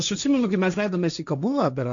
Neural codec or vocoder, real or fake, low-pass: codec, 16 kHz, 1.1 kbps, Voila-Tokenizer; fake; 7.2 kHz